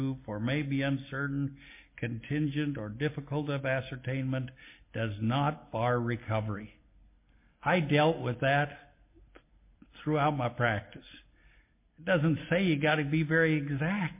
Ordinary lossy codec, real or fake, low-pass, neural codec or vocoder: MP3, 24 kbps; real; 3.6 kHz; none